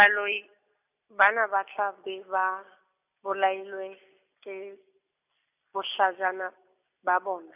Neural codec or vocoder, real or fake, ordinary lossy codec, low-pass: none; real; none; 3.6 kHz